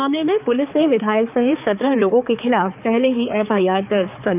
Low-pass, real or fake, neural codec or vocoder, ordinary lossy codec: 3.6 kHz; fake; codec, 16 kHz, 4 kbps, X-Codec, HuBERT features, trained on balanced general audio; none